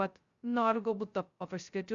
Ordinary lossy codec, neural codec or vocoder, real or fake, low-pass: Opus, 64 kbps; codec, 16 kHz, 0.2 kbps, FocalCodec; fake; 7.2 kHz